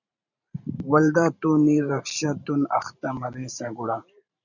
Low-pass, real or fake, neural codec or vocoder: 7.2 kHz; fake; vocoder, 44.1 kHz, 128 mel bands every 512 samples, BigVGAN v2